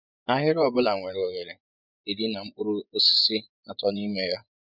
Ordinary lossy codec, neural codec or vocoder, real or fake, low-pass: none; vocoder, 24 kHz, 100 mel bands, Vocos; fake; 5.4 kHz